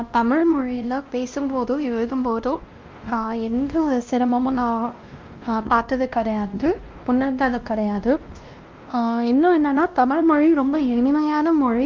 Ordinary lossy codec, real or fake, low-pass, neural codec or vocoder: Opus, 32 kbps; fake; 7.2 kHz; codec, 16 kHz, 1 kbps, X-Codec, WavLM features, trained on Multilingual LibriSpeech